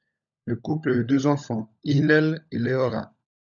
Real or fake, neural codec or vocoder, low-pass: fake; codec, 16 kHz, 16 kbps, FunCodec, trained on LibriTTS, 50 frames a second; 7.2 kHz